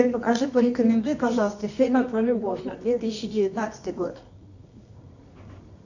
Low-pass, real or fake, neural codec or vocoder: 7.2 kHz; fake; codec, 24 kHz, 0.9 kbps, WavTokenizer, medium music audio release